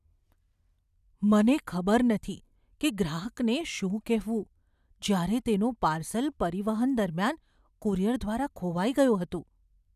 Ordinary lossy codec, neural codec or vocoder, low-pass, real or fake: none; none; 14.4 kHz; real